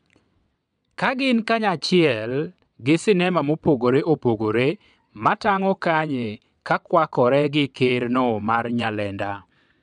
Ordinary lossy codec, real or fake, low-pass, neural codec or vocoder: none; fake; 9.9 kHz; vocoder, 22.05 kHz, 80 mel bands, WaveNeXt